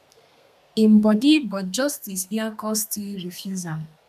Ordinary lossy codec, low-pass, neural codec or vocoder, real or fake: none; 14.4 kHz; codec, 32 kHz, 1.9 kbps, SNAC; fake